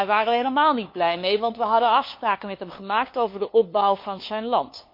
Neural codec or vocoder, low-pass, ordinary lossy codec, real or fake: codec, 16 kHz, 2 kbps, FunCodec, trained on LibriTTS, 25 frames a second; 5.4 kHz; MP3, 32 kbps; fake